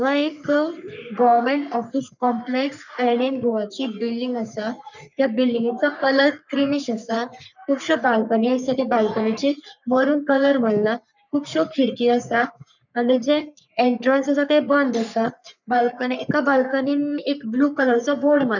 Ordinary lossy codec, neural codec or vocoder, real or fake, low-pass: none; codec, 44.1 kHz, 3.4 kbps, Pupu-Codec; fake; 7.2 kHz